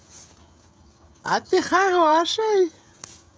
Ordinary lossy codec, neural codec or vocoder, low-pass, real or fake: none; codec, 16 kHz, 16 kbps, FreqCodec, smaller model; none; fake